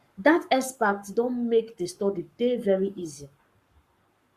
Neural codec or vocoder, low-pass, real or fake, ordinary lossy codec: codec, 44.1 kHz, 7.8 kbps, Pupu-Codec; 14.4 kHz; fake; Opus, 64 kbps